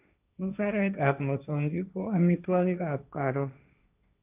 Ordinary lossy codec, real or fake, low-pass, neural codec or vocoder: none; fake; 3.6 kHz; codec, 16 kHz, 1.1 kbps, Voila-Tokenizer